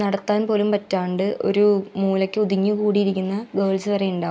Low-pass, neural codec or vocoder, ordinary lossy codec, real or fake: none; none; none; real